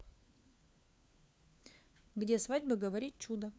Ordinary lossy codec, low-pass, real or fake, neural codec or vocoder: none; none; fake; codec, 16 kHz, 4 kbps, FunCodec, trained on LibriTTS, 50 frames a second